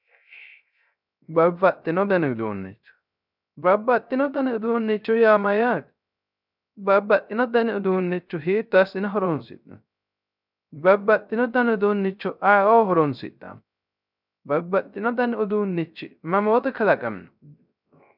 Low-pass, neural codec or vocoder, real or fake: 5.4 kHz; codec, 16 kHz, 0.3 kbps, FocalCodec; fake